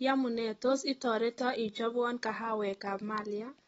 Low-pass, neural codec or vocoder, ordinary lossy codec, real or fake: 19.8 kHz; none; AAC, 24 kbps; real